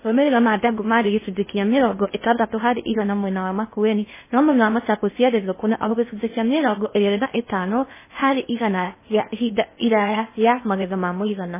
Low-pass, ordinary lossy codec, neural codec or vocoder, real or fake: 3.6 kHz; MP3, 16 kbps; codec, 16 kHz in and 24 kHz out, 0.6 kbps, FocalCodec, streaming, 2048 codes; fake